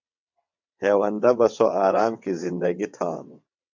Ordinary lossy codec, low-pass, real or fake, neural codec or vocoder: MP3, 64 kbps; 7.2 kHz; fake; vocoder, 22.05 kHz, 80 mel bands, WaveNeXt